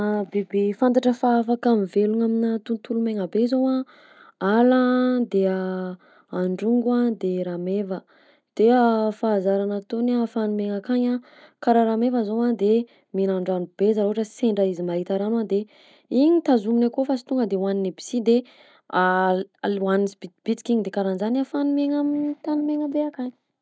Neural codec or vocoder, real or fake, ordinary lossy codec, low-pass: none; real; none; none